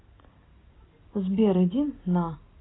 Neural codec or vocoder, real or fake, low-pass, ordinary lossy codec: none; real; 7.2 kHz; AAC, 16 kbps